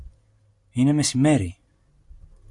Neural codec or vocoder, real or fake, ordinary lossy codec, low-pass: none; real; MP3, 64 kbps; 10.8 kHz